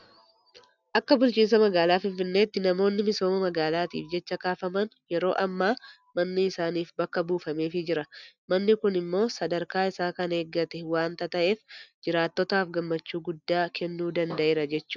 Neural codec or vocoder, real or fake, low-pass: none; real; 7.2 kHz